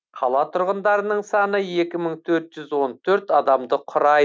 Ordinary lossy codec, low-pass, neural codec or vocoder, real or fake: none; none; none; real